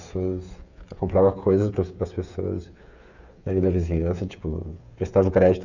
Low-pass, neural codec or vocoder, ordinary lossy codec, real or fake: 7.2 kHz; codec, 16 kHz, 8 kbps, FreqCodec, smaller model; none; fake